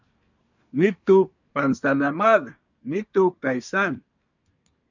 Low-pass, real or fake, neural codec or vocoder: 7.2 kHz; fake; codec, 24 kHz, 1 kbps, SNAC